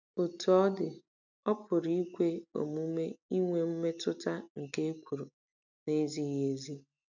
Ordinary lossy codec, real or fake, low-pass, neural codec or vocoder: none; real; 7.2 kHz; none